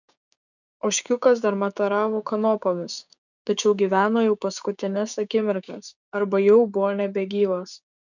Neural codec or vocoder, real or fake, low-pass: codec, 16 kHz, 6 kbps, DAC; fake; 7.2 kHz